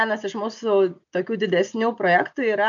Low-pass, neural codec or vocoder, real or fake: 7.2 kHz; none; real